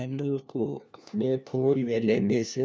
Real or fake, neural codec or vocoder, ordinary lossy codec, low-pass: fake; codec, 16 kHz, 1 kbps, FunCodec, trained on LibriTTS, 50 frames a second; none; none